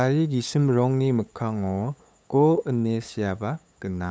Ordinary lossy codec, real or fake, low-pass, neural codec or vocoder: none; fake; none; codec, 16 kHz, 8 kbps, FunCodec, trained on LibriTTS, 25 frames a second